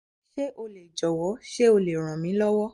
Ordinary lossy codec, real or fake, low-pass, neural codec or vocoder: MP3, 48 kbps; real; 14.4 kHz; none